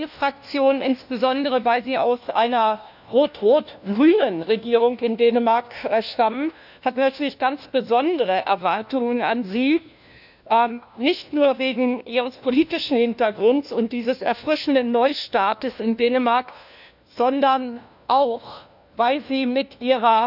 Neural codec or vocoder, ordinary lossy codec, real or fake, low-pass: codec, 16 kHz, 1 kbps, FunCodec, trained on LibriTTS, 50 frames a second; none; fake; 5.4 kHz